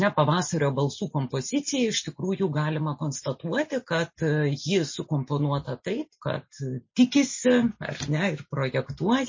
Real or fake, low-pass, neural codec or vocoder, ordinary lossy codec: real; 7.2 kHz; none; MP3, 32 kbps